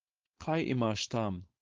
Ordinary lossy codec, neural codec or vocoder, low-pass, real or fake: Opus, 16 kbps; codec, 16 kHz, 4.8 kbps, FACodec; 7.2 kHz; fake